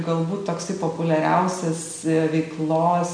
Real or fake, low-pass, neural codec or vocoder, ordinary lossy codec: real; 9.9 kHz; none; MP3, 96 kbps